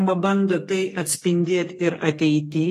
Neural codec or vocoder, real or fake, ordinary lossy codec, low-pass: codec, 32 kHz, 1.9 kbps, SNAC; fake; AAC, 48 kbps; 14.4 kHz